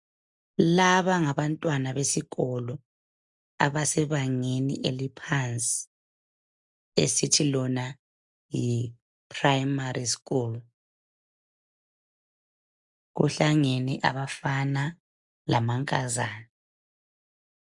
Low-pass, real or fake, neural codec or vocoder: 10.8 kHz; real; none